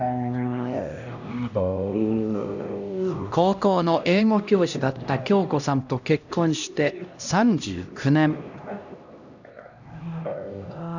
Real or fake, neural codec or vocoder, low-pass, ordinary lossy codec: fake; codec, 16 kHz, 1 kbps, X-Codec, HuBERT features, trained on LibriSpeech; 7.2 kHz; none